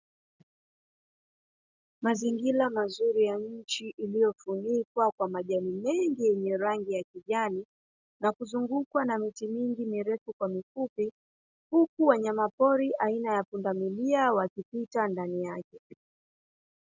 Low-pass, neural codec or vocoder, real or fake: 7.2 kHz; none; real